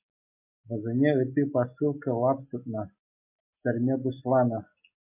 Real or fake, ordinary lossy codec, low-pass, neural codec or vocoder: real; MP3, 32 kbps; 3.6 kHz; none